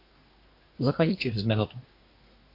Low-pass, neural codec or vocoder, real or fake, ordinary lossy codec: 5.4 kHz; codec, 24 kHz, 1 kbps, SNAC; fake; AAC, 32 kbps